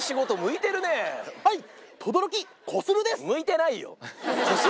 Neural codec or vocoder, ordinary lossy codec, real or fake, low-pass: none; none; real; none